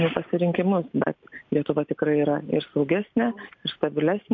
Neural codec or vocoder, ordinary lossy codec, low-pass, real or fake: none; MP3, 48 kbps; 7.2 kHz; real